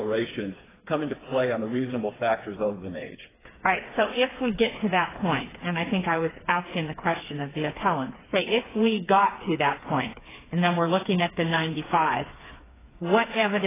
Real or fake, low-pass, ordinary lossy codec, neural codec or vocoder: fake; 3.6 kHz; AAC, 16 kbps; codec, 16 kHz, 4 kbps, FreqCodec, smaller model